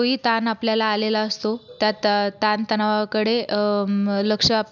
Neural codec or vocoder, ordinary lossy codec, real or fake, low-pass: none; none; real; 7.2 kHz